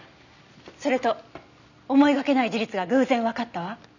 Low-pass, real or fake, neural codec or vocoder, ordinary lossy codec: 7.2 kHz; real; none; none